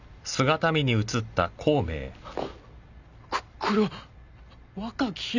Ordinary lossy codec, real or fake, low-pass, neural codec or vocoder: none; real; 7.2 kHz; none